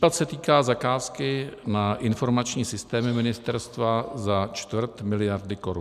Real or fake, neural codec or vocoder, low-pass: real; none; 14.4 kHz